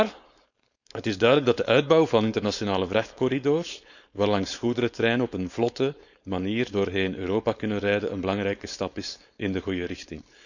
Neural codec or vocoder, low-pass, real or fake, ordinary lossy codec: codec, 16 kHz, 4.8 kbps, FACodec; 7.2 kHz; fake; none